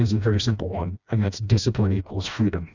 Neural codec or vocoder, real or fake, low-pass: codec, 16 kHz, 1 kbps, FreqCodec, smaller model; fake; 7.2 kHz